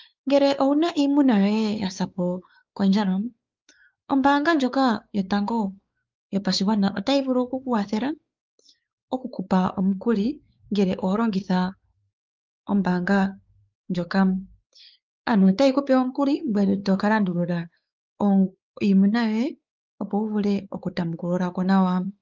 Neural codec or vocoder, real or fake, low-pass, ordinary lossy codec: codec, 16 kHz, 4 kbps, X-Codec, WavLM features, trained on Multilingual LibriSpeech; fake; 7.2 kHz; Opus, 24 kbps